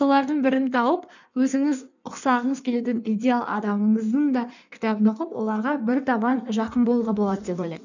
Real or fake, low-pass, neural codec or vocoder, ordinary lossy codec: fake; 7.2 kHz; codec, 16 kHz in and 24 kHz out, 1.1 kbps, FireRedTTS-2 codec; none